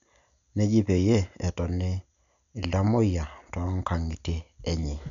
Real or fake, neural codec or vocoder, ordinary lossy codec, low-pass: real; none; none; 7.2 kHz